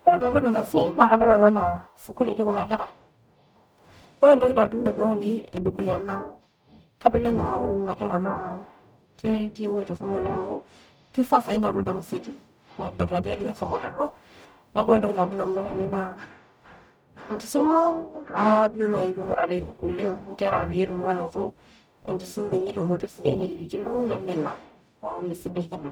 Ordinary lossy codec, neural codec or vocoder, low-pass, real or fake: none; codec, 44.1 kHz, 0.9 kbps, DAC; none; fake